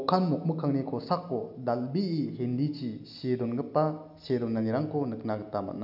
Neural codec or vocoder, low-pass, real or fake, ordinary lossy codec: none; 5.4 kHz; real; none